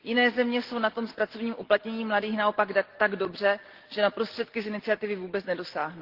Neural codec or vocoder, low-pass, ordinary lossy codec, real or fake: none; 5.4 kHz; Opus, 16 kbps; real